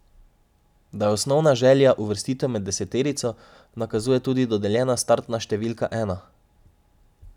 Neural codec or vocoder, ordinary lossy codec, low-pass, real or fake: none; none; 19.8 kHz; real